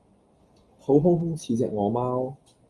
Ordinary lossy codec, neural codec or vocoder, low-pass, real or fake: Opus, 32 kbps; none; 10.8 kHz; real